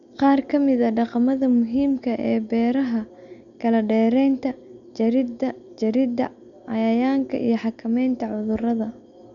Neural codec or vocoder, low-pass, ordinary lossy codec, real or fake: none; 7.2 kHz; none; real